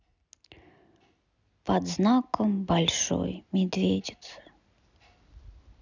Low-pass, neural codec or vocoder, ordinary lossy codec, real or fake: 7.2 kHz; none; none; real